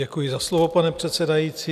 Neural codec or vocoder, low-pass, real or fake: none; 14.4 kHz; real